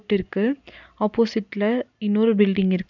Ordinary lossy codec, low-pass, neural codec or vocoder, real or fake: none; 7.2 kHz; none; real